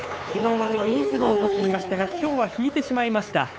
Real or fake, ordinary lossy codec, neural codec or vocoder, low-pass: fake; none; codec, 16 kHz, 4 kbps, X-Codec, HuBERT features, trained on LibriSpeech; none